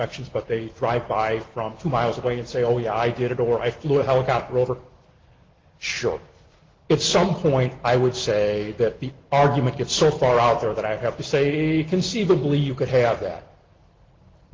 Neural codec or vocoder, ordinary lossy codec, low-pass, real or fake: none; Opus, 16 kbps; 7.2 kHz; real